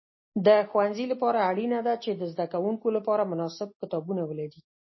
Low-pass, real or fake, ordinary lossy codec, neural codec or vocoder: 7.2 kHz; real; MP3, 24 kbps; none